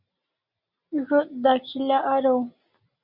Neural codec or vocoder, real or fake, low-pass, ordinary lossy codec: none; real; 5.4 kHz; Opus, 64 kbps